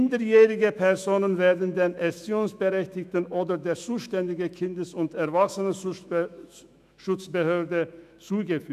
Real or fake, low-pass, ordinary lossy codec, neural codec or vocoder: fake; 14.4 kHz; none; autoencoder, 48 kHz, 128 numbers a frame, DAC-VAE, trained on Japanese speech